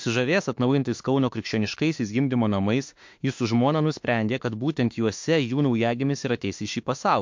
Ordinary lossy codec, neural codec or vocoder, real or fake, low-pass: MP3, 48 kbps; autoencoder, 48 kHz, 32 numbers a frame, DAC-VAE, trained on Japanese speech; fake; 7.2 kHz